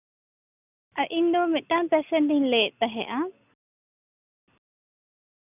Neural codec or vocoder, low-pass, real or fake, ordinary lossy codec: none; 3.6 kHz; real; none